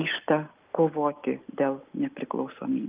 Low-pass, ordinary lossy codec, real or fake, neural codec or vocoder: 3.6 kHz; Opus, 32 kbps; real; none